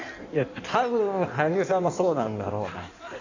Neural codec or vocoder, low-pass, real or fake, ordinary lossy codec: codec, 16 kHz in and 24 kHz out, 1.1 kbps, FireRedTTS-2 codec; 7.2 kHz; fake; AAC, 48 kbps